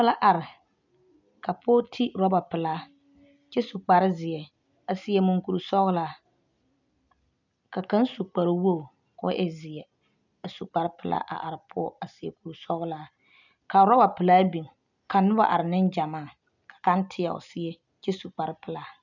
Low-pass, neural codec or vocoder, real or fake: 7.2 kHz; none; real